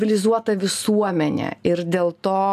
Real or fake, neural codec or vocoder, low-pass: real; none; 14.4 kHz